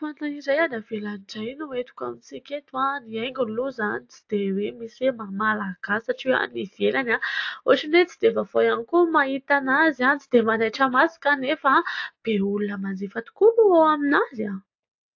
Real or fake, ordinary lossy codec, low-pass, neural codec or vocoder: real; AAC, 48 kbps; 7.2 kHz; none